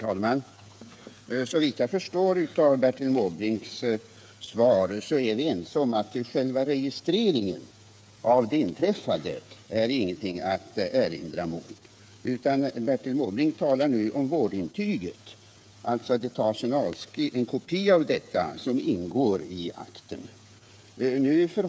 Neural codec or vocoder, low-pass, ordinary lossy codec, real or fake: codec, 16 kHz, 8 kbps, FreqCodec, smaller model; none; none; fake